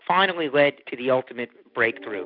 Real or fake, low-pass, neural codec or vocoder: real; 5.4 kHz; none